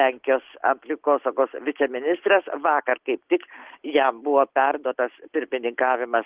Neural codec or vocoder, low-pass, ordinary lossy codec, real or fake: none; 3.6 kHz; Opus, 16 kbps; real